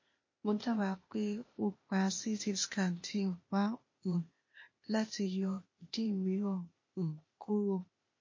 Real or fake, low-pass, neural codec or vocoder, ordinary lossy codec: fake; 7.2 kHz; codec, 16 kHz, 0.8 kbps, ZipCodec; MP3, 32 kbps